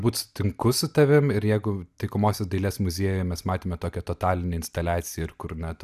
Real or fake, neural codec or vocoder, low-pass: real; none; 14.4 kHz